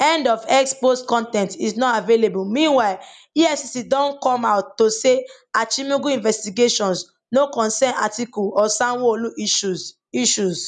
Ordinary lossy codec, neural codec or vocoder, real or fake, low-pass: none; none; real; 10.8 kHz